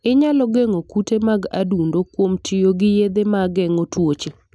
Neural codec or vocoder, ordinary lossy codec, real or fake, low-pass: none; none; real; none